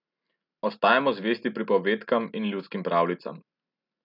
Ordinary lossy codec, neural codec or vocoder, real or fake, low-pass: none; none; real; 5.4 kHz